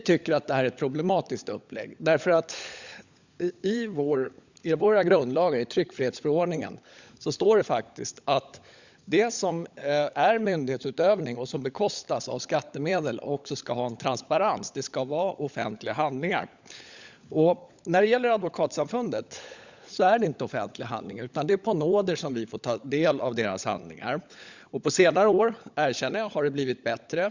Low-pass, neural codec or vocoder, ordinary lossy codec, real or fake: 7.2 kHz; codec, 16 kHz, 16 kbps, FunCodec, trained on LibriTTS, 50 frames a second; Opus, 64 kbps; fake